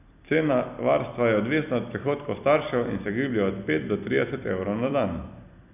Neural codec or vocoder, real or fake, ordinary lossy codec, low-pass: vocoder, 44.1 kHz, 128 mel bands every 256 samples, BigVGAN v2; fake; none; 3.6 kHz